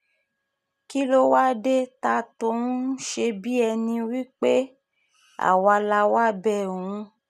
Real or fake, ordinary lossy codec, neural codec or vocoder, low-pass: real; none; none; 14.4 kHz